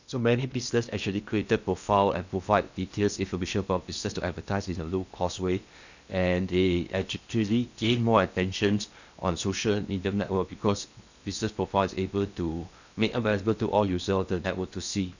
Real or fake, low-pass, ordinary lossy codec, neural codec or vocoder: fake; 7.2 kHz; none; codec, 16 kHz in and 24 kHz out, 0.8 kbps, FocalCodec, streaming, 65536 codes